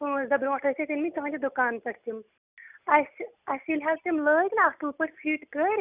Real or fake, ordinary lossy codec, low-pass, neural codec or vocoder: real; none; 3.6 kHz; none